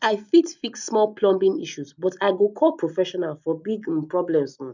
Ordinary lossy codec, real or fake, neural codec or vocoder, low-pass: none; real; none; 7.2 kHz